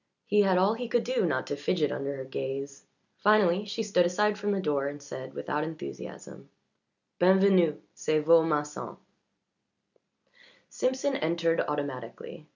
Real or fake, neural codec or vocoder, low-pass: real; none; 7.2 kHz